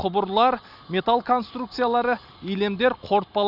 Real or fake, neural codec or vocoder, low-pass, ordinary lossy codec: real; none; 5.4 kHz; none